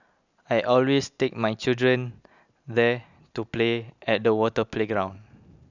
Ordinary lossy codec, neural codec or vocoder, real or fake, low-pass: none; none; real; 7.2 kHz